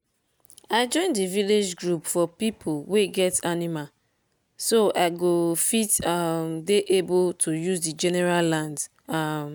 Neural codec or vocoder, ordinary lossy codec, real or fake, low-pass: none; none; real; none